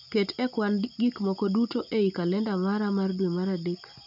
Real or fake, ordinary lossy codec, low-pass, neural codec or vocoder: real; none; 5.4 kHz; none